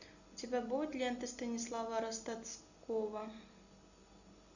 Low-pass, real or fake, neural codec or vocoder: 7.2 kHz; real; none